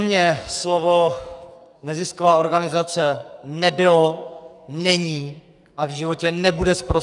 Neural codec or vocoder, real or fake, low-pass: codec, 44.1 kHz, 2.6 kbps, SNAC; fake; 10.8 kHz